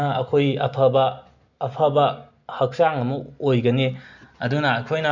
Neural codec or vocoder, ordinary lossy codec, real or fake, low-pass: none; none; real; 7.2 kHz